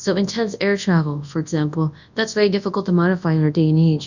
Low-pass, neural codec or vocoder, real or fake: 7.2 kHz; codec, 24 kHz, 0.9 kbps, WavTokenizer, large speech release; fake